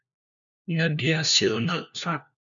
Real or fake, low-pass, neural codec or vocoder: fake; 7.2 kHz; codec, 16 kHz, 1 kbps, FunCodec, trained on LibriTTS, 50 frames a second